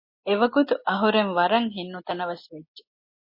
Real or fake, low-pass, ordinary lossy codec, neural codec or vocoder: real; 5.4 kHz; MP3, 24 kbps; none